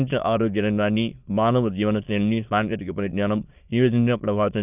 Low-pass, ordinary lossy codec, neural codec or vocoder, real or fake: 3.6 kHz; none; autoencoder, 22.05 kHz, a latent of 192 numbers a frame, VITS, trained on many speakers; fake